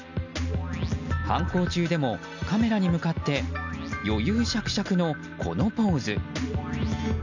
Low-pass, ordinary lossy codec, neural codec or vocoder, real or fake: 7.2 kHz; none; none; real